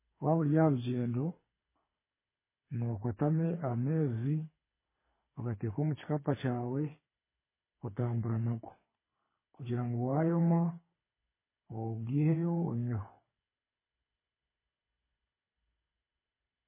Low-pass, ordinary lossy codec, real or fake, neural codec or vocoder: 3.6 kHz; MP3, 16 kbps; fake; codec, 24 kHz, 6 kbps, HILCodec